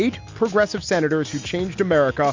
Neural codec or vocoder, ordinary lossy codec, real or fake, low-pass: none; MP3, 64 kbps; real; 7.2 kHz